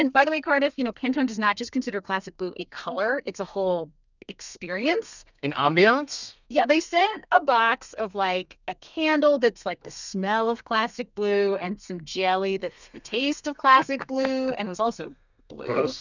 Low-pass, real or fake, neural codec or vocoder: 7.2 kHz; fake; codec, 32 kHz, 1.9 kbps, SNAC